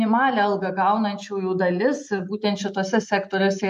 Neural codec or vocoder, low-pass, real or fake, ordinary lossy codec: none; 14.4 kHz; real; MP3, 64 kbps